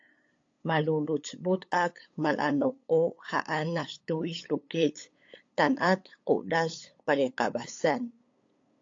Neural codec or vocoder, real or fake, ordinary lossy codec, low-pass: codec, 16 kHz, 8 kbps, FunCodec, trained on LibriTTS, 25 frames a second; fake; AAC, 48 kbps; 7.2 kHz